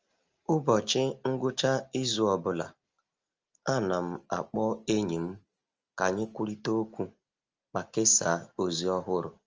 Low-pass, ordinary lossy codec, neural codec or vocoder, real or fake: 7.2 kHz; Opus, 24 kbps; none; real